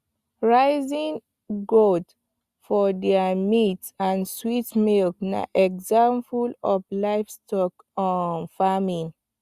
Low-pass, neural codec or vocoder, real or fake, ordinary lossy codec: 14.4 kHz; none; real; Opus, 64 kbps